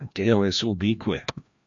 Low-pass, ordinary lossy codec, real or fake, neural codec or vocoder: 7.2 kHz; MP3, 48 kbps; fake; codec, 16 kHz, 1 kbps, FreqCodec, larger model